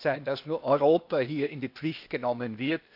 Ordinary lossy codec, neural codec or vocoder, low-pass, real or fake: none; codec, 16 kHz, 0.8 kbps, ZipCodec; 5.4 kHz; fake